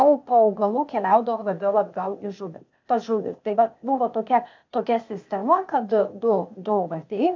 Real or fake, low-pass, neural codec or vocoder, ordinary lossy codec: fake; 7.2 kHz; codec, 16 kHz, 0.8 kbps, ZipCodec; MP3, 64 kbps